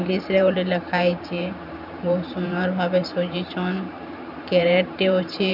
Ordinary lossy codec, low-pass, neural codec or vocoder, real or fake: none; 5.4 kHz; vocoder, 44.1 kHz, 128 mel bands every 512 samples, BigVGAN v2; fake